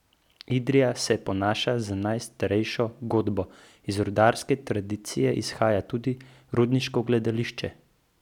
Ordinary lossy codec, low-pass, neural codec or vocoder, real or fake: none; 19.8 kHz; none; real